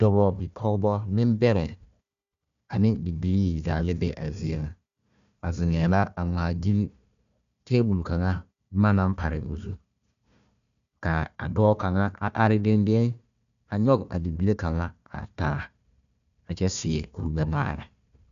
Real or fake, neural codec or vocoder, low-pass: fake; codec, 16 kHz, 1 kbps, FunCodec, trained on Chinese and English, 50 frames a second; 7.2 kHz